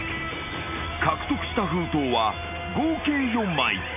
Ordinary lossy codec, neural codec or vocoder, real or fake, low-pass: none; none; real; 3.6 kHz